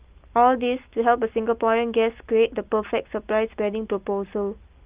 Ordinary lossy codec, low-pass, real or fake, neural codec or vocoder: Opus, 24 kbps; 3.6 kHz; real; none